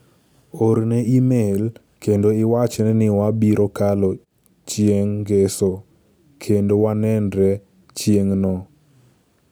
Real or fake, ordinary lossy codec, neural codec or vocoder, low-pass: real; none; none; none